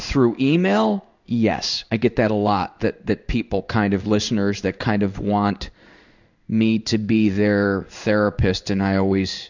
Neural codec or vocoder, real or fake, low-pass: codec, 16 kHz in and 24 kHz out, 1 kbps, XY-Tokenizer; fake; 7.2 kHz